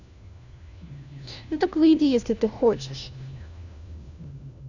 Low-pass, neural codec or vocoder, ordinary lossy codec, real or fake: 7.2 kHz; codec, 16 kHz, 1 kbps, FunCodec, trained on LibriTTS, 50 frames a second; none; fake